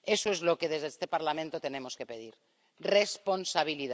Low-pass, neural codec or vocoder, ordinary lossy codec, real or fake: none; none; none; real